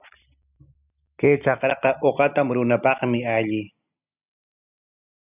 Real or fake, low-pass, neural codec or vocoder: real; 3.6 kHz; none